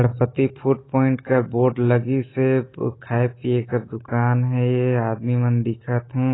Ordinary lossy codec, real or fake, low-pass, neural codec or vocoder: AAC, 16 kbps; real; 7.2 kHz; none